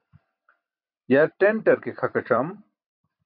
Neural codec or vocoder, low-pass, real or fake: vocoder, 44.1 kHz, 128 mel bands every 256 samples, BigVGAN v2; 5.4 kHz; fake